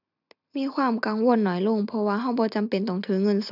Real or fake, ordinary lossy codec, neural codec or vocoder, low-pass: real; none; none; 5.4 kHz